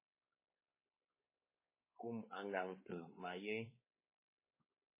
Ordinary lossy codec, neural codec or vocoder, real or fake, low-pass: MP3, 16 kbps; codec, 16 kHz, 4 kbps, X-Codec, WavLM features, trained on Multilingual LibriSpeech; fake; 3.6 kHz